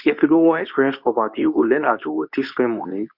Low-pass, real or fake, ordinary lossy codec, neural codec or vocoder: 5.4 kHz; fake; none; codec, 24 kHz, 0.9 kbps, WavTokenizer, medium speech release version 2